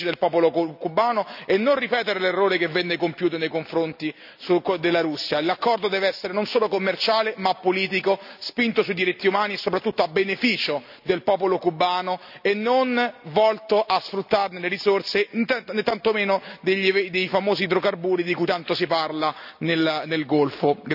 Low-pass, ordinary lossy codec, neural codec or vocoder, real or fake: 5.4 kHz; none; none; real